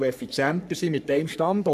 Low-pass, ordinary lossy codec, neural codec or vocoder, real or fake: 14.4 kHz; none; codec, 44.1 kHz, 3.4 kbps, Pupu-Codec; fake